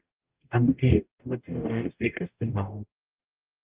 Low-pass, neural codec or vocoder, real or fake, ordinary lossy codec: 3.6 kHz; codec, 44.1 kHz, 0.9 kbps, DAC; fake; Opus, 32 kbps